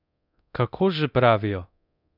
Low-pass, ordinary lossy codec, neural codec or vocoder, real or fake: 5.4 kHz; none; codec, 24 kHz, 0.9 kbps, DualCodec; fake